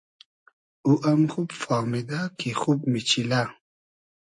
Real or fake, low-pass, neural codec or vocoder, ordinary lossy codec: real; 10.8 kHz; none; MP3, 48 kbps